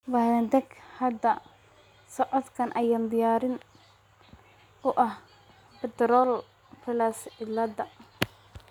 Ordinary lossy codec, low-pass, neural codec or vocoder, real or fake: none; 19.8 kHz; none; real